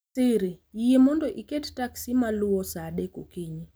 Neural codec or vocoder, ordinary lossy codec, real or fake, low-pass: none; none; real; none